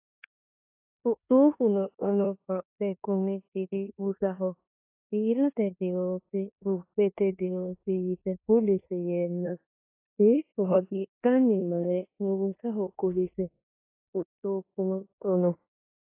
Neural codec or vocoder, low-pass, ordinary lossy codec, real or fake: codec, 16 kHz in and 24 kHz out, 0.9 kbps, LongCat-Audio-Codec, four codebook decoder; 3.6 kHz; AAC, 24 kbps; fake